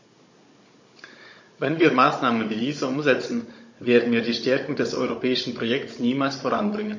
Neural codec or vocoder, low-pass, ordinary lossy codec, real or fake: codec, 16 kHz, 16 kbps, FunCodec, trained on Chinese and English, 50 frames a second; 7.2 kHz; MP3, 32 kbps; fake